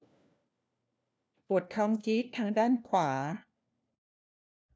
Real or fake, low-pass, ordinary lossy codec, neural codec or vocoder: fake; none; none; codec, 16 kHz, 1 kbps, FunCodec, trained on LibriTTS, 50 frames a second